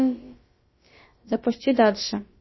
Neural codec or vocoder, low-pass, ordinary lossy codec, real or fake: codec, 16 kHz, about 1 kbps, DyCAST, with the encoder's durations; 7.2 kHz; MP3, 24 kbps; fake